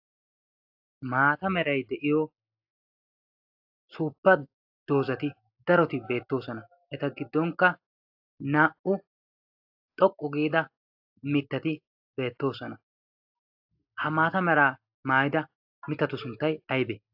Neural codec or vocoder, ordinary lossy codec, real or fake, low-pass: none; AAC, 48 kbps; real; 5.4 kHz